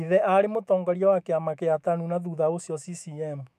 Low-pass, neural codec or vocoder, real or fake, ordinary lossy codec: 14.4 kHz; autoencoder, 48 kHz, 128 numbers a frame, DAC-VAE, trained on Japanese speech; fake; none